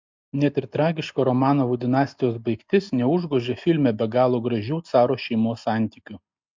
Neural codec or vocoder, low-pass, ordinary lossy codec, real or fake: none; 7.2 kHz; MP3, 64 kbps; real